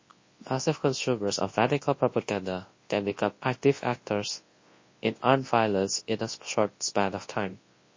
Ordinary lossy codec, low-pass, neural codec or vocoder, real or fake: MP3, 32 kbps; 7.2 kHz; codec, 24 kHz, 0.9 kbps, WavTokenizer, large speech release; fake